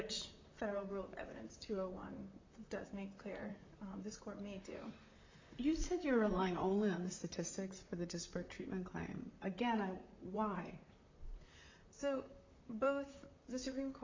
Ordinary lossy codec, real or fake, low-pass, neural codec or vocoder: MP3, 64 kbps; fake; 7.2 kHz; vocoder, 44.1 kHz, 128 mel bands, Pupu-Vocoder